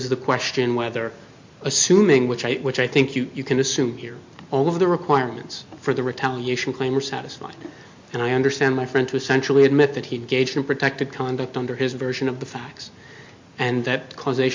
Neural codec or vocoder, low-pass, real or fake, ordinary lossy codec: none; 7.2 kHz; real; MP3, 48 kbps